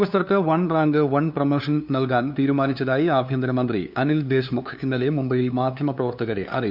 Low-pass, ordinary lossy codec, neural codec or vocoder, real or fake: 5.4 kHz; none; codec, 16 kHz, 2 kbps, FunCodec, trained on LibriTTS, 25 frames a second; fake